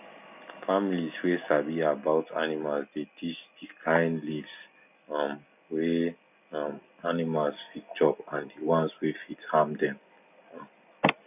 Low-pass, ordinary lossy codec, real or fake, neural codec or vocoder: 3.6 kHz; none; real; none